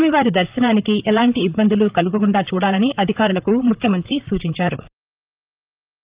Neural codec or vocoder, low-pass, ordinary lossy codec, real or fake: vocoder, 44.1 kHz, 128 mel bands, Pupu-Vocoder; 3.6 kHz; Opus, 32 kbps; fake